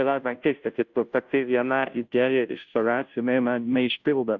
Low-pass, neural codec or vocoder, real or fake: 7.2 kHz; codec, 16 kHz, 0.5 kbps, FunCodec, trained on Chinese and English, 25 frames a second; fake